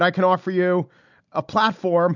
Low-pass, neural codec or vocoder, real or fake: 7.2 kHz; none; real